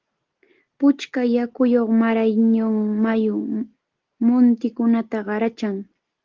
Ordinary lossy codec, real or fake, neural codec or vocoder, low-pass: Opus, 16 kbps; real; none; 7.2 kHz